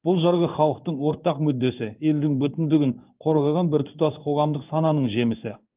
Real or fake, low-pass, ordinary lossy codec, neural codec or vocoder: fake; 3.6 kHz; Opus, 32 kbps; codec, 16 kHz in and 24 kHz out, 1 kbps, XY-Tokenizer